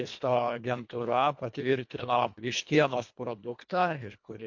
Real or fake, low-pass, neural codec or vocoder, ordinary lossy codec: fake; 7.2 kHz; codec, 24 kHz, 1.5 kbps, HILCodec; MP3, 48 kbps